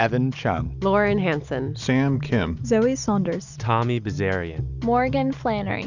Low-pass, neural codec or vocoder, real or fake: 7.2 kHz; none; real